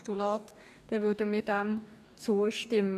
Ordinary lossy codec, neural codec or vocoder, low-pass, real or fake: none; codec, 44.1 kHz, 2.6 kbps, DAC; 14.4 kHz; fake